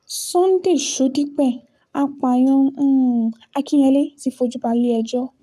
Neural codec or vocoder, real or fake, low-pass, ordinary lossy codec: codec, 44.1 kHz, 7.8 kbps, Pupu-Codec; fake; 14.4 kHz; none